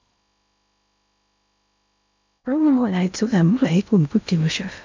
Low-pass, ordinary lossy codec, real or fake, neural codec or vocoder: 7.2 kHz; MP3, 64 kbps; fake; codec, 16 kHz in and 24 kHz out, 0.6 kbps, FocalCodec, streaming, 2048 codes